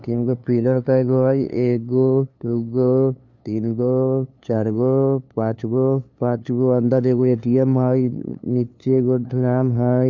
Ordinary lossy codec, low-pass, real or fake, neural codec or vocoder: none; none; fake; codec, 16 kHz, 2 kbps, FunCodec, trained on LibriTTS, 25 frames a second